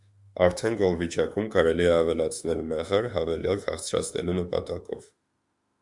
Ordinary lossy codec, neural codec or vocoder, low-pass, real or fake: Opus, 64 kbps; autoencoder, 48 kHz, 32 numbers a frame, DAC-VAE, trained on Japanese speech; 10.8 kHz; fake